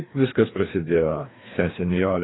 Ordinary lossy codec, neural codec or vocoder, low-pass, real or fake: AAC, 16 kbps; codec, 16 kHz, 2 kbps, FreqCodec, larger model; 7.2 kHz; fake